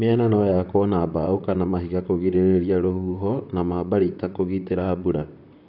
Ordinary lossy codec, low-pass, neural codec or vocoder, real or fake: none; 5.4 kHz; vocoder, 44.1 kHz, 128 mel bands, Pupu-Vocoder; fake